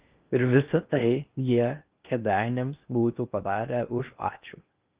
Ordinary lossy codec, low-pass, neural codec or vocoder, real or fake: Opus, 32 kbps; 3.6 kHz; codec, 16 kHz in and 24 kHz out, 0.6 kbps, FocalCodec, streaming, 4096 codes; fake